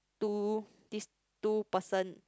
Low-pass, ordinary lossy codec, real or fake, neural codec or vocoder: none; none; real; none